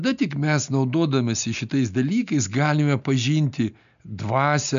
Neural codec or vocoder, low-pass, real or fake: none; 7.2 kHz; real